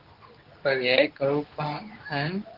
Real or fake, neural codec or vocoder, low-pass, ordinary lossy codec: fake; codec, 24 kHz, 0.9 kbps, WavTokenizer, medium speech release version 2; 5.4 kHz; Opus, 16 kbps